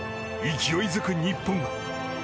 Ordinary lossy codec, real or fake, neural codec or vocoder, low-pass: none; real; none; none